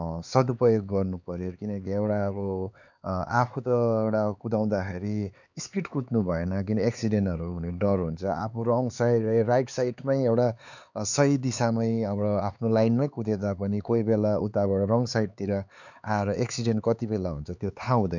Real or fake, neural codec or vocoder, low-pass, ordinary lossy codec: fake; codec, 16 kHz, 4 kbps, X-Codec, HuBERT features, trained on LibriSpeech; 7.2 kHz; none